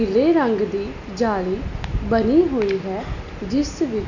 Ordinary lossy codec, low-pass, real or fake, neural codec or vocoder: none; 7.2 kHz; real; none